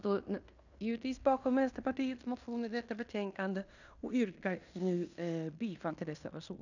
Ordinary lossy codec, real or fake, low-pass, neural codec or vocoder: none; fake; 7.2 kHz; codec, 16 kHz in and 24 kHz out, 0.9 kbps, LongCat-Audio-Codec, fine tuned four codebook decoder